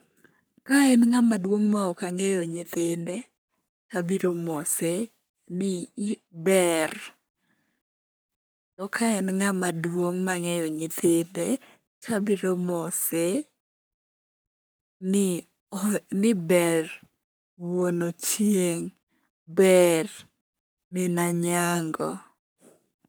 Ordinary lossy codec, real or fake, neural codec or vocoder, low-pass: none; fake; codec, 44.1 kHz, 3.4 kbps, Pupu-Codec; none